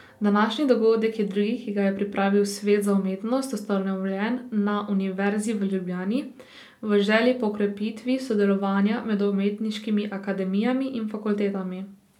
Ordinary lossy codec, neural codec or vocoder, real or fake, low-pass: none; none; real; 19.8 kHz